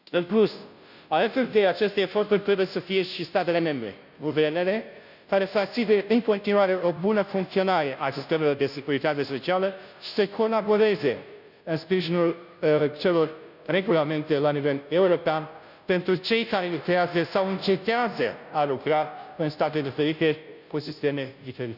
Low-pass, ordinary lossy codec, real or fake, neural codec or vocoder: 5.4 kHz; none; fake; codec, 16 kHz, 0.5 kbps, FunCodec, trained on Chinese and English, 25 frames a second